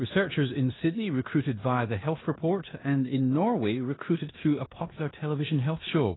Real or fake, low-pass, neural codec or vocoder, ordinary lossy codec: fake; 7.2 kHz; codec, 16 kHz in and 24 kHz out, 0.9 kbps, LongCat-Audio-Codec, four codebook decoder; AAC, 16 kbps